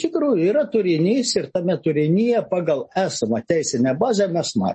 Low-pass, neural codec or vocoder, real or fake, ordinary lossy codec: 10.8 kHz; none; real; MP3, 32 kbps